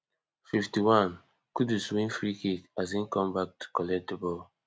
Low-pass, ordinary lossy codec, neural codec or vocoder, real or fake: none; none; none; real